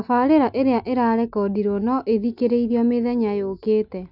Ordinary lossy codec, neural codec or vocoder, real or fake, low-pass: none; none; real; 5.4 kHz